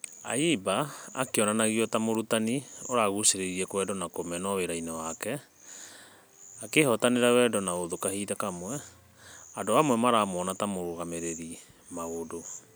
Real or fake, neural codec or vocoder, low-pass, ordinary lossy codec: real; none; none; none